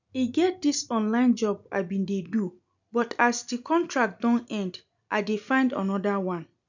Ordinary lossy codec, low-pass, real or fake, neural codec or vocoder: none; 7.2 kHz; real; none